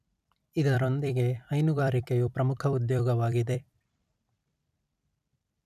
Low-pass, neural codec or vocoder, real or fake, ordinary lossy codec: 14.4 kHz; vocoder, 44.1 kHz, 128 mel bands every 256 samples, BigVGAN v2; fake; none